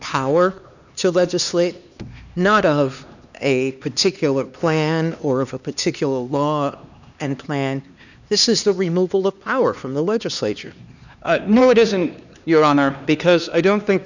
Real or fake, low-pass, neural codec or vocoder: fake; 7.2 kHz; codec, 16 kHz, 2 kbps, X-Codec, HuBERT features, trained on LibriSpeech